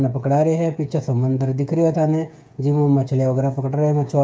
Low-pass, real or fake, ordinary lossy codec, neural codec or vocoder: none; fake; none; codec, 16 kHz, 8 kbps, FreqCodec, smaller model